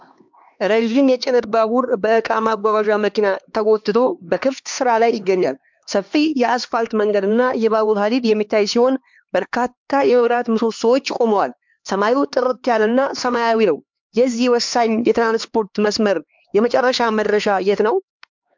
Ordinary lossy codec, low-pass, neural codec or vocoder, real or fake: MP3, 64 kbps; 7.2 kHz; codec, 16 kHz, 2 kbps, X-Codec, HuBERT features, trained on LibriSpeech; fake